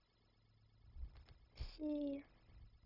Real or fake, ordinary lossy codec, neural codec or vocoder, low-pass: fake; none; codec, 16 kHz, 0.4 kbps, LongCat-Audio-Codec; 5.4 kHz